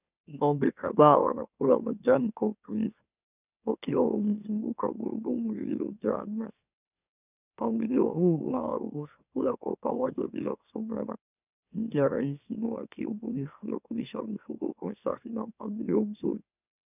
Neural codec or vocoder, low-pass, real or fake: autoencoder, 44.1 kHz, a latent of 192 numbers a frame, MeloTTS; 3.6 kHz; fake